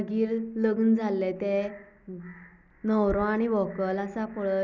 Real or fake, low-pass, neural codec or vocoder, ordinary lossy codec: real; 7.2 kHz; none; none